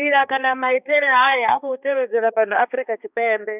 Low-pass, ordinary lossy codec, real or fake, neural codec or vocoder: 3.6 kHz; none; fake; codec, 16 kHz, 2 kbps, X-Codec, HuBERT features, trained on balanced general audio